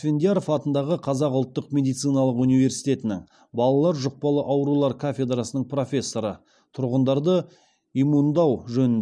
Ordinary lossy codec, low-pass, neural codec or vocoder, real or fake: none; none; none; real